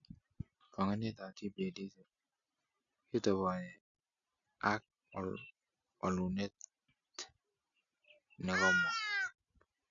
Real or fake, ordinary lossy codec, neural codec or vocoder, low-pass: real; none; none; 7.2 kHz